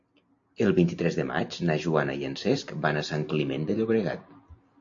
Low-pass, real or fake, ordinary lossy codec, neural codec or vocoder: 7.2 kHz; real; AAC, 48 kbps; none